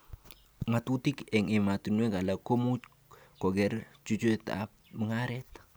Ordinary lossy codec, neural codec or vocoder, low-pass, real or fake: none; none; none; real